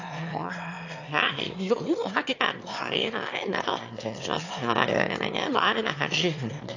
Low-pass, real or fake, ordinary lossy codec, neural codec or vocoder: 7.2 kHz; fake; AAC, 48 kbps; autoencoder, 22.05 kHz, a latent of 192 numbers a frame, VITS, trained on one speaker